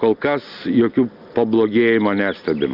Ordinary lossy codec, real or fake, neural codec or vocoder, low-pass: Opus, 24 kbps; real; none; 5.4 kHz